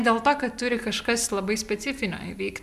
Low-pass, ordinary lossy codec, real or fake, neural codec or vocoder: 14.4 kHz; MP3, 96 kbps; real; none